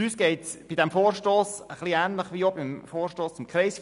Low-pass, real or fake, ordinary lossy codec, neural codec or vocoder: 10.8 kHz; real; none; none